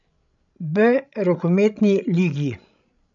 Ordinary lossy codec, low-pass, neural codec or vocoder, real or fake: none; 7.2 kHz; codec, 16 kHz, 16 kbps, FreqCodec, larger model; fake